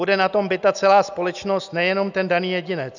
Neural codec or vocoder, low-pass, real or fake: none; 7.2 kHz; real